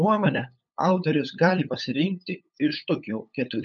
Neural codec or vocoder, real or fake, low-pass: codec, 16 kHz, 8 kbps, FunCodec, trained on LibriTTS, 25 frames a second; fake; 7.2 kHz